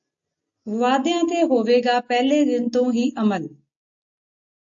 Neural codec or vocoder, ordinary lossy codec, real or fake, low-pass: none; AAC, 64 kbps; real; 7.2 kHz